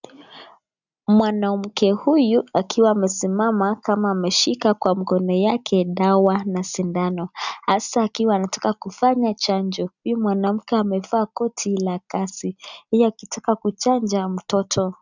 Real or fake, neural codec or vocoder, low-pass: real; none; 7.2 kHz